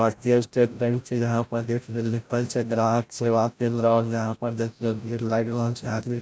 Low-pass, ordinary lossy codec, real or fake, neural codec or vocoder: none; none; fake; codec, 16 kHz, 0.5 kbps, FreqCodec, larger model